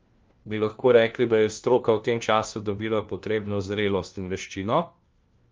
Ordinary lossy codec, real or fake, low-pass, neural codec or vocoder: Opus, 32 kbps; fake; 7.2 kHz; codec, 16 kHz, 1 kbps, FunCodec, trained on LibriTTS, 50 frames a second